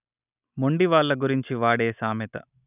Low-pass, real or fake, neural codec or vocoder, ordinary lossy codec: 3.6 kHz; real; none; none